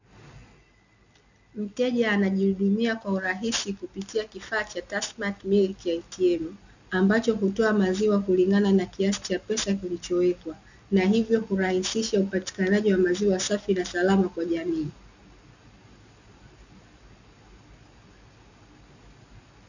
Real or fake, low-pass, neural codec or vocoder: real; 7.2 kHz; none